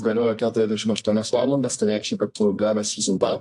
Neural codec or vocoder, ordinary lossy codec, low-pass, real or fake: codec, 24 kHz, 0.9 kbps, WavTokenizer, medium music audio release; AAC, 64 kbps; 10.8 kHz; fake